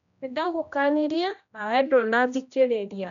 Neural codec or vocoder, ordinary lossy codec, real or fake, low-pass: codec, 16 kHz, 1 kbps, X-Codec, HuBERT features, trained on general audio; none; fake; 7.2 kHz